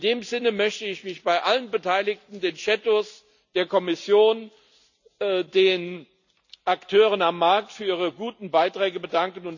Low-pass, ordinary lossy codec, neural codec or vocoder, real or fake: 7.2 kHz; none; none; real